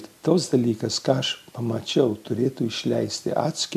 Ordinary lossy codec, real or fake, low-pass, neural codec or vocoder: MP3, 96 kbps; real; 14.4 kHz; none